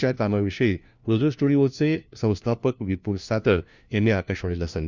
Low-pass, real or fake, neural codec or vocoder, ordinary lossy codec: 7.2 kHz; fake; codec, 16 kHz, 1 kbps, FunCodec, trained on LibriTTS, 50 frames a second; Opus, 64 kbps